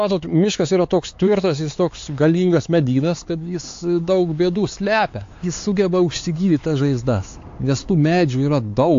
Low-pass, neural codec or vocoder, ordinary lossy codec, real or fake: 7.2 kHz; codec, 16 kHz, 4 kbps, X-Codec, HuBERT features, trained on LibriSpeech; AAC, 48 kbps; fake